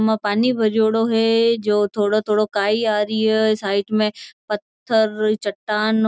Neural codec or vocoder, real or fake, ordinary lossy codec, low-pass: none; real; none; none